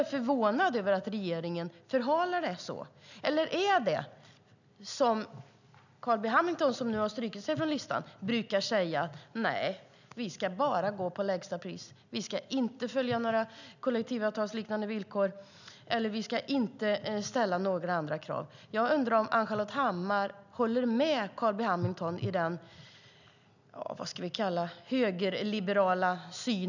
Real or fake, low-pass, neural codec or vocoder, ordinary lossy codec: real; 7.2 kHz; none; none